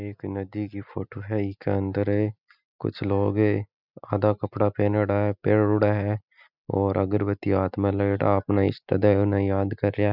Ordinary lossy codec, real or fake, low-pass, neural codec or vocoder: none; real; 5.4 kHz; none